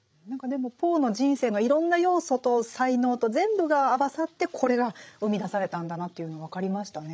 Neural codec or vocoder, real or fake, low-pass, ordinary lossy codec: codec, 16 kHz, 16 kbps, FreqCodec, larger model; fake; none; none